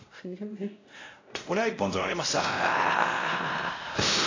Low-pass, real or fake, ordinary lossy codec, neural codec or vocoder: 7.2 kHz; fake; AAC, 32 kbps; codec, 16 kHz, 0.5 kbps, X-Codec, WavLM features, trained on Multilingual LibriSpeech